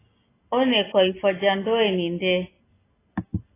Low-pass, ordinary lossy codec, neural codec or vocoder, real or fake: 3.6 kHz; AAC, 16 kbps; none; real